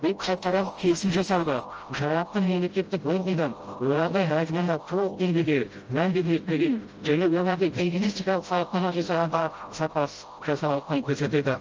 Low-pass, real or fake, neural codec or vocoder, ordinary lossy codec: 7.2 kHz; fake; codec, 16 kHz, 0.5 kbps, FreqCodec, smaller model; Opus, 24 kbps